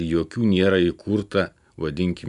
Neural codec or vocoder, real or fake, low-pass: none; real; 10.8 kHz